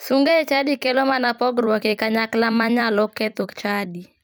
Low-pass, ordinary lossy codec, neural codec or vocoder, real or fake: none; none; vocoder, 44.1 kHz, 128 mel bands every 256 samples, BigVGAN v2; fake